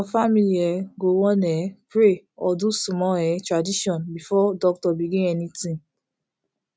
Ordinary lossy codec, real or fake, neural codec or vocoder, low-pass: none; real; none; none